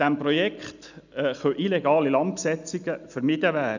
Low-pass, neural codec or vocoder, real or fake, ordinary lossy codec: 7.2 kHz; none; real; none